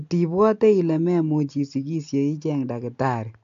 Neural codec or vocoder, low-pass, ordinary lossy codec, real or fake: none; 7.2 kHz; AAC, 64 kbps; real